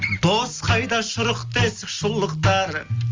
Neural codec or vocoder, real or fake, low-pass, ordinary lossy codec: none; real; 7.2 kHz; Opus, 32 kbps